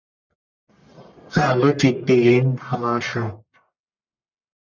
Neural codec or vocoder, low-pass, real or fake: codec, 44.1 kHz, 1.7 kbps, Pupu-Codec; 7.2 kHz; fake